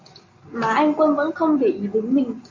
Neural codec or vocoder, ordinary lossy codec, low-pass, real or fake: none; MP3, 64 kbps; 7.2 kHz; real